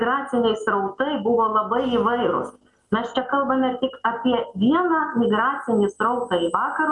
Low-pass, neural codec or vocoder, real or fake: 10.8 kHz; none; real